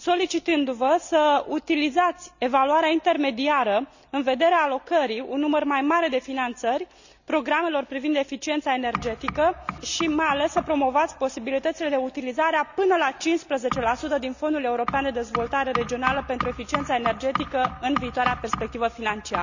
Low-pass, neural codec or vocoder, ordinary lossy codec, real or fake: 7.2 kHz; none; none; real